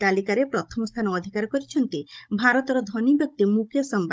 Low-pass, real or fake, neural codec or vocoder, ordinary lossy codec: none; fake; codec, 16 kHz, 16 kbps, FunCodec, trained on Chinese and English, 50 frames a second; none